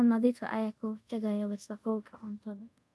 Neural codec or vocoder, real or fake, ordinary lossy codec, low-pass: codec, 24 kHz, 0.5 kbps, DualCodec; fake; none; none